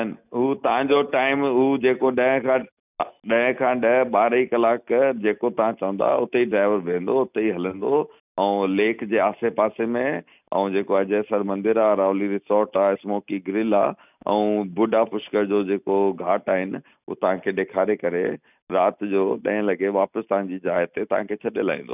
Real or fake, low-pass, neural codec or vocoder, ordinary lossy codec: real; 3.6 kHz; none; none